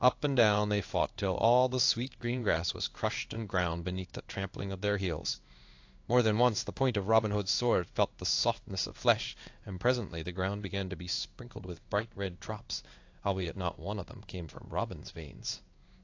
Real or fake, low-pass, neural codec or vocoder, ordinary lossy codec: fake; 7.2 kHz; codec, 16 kHz in and 24 kHz out, 1 kbps, XY-Tokenizer; AAC, 48 kbps